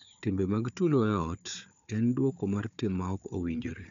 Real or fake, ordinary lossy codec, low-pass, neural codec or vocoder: fake; none; 7.2 kHz; codec, 16 kHz, 4 kbps, FunCodec, trained on Chinese and English, 50 frames a second